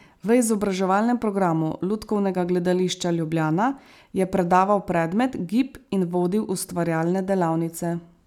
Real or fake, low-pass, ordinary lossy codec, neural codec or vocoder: real; 19.8 kHz; none; none